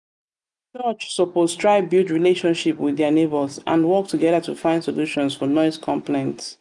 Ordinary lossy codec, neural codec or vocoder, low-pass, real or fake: none; none; 10.8 kHz; real